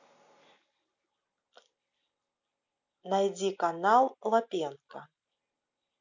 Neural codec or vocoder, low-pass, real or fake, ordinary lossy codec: none; 7.2 kHz; real; none